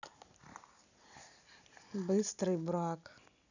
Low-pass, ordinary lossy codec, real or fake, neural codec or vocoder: 7.2 kHz; none; real; none